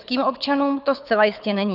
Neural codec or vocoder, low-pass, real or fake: codec, 24 kHz, 6 kbps, HILCodec; 5.4 kHz; fake